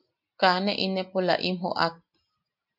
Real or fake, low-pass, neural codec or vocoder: real; 5.4 kHz; none